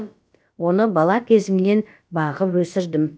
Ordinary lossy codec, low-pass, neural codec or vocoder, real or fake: none; none; codec, 16 kHz, about 1 kbps, DyCAST, with the encoder's durations; fake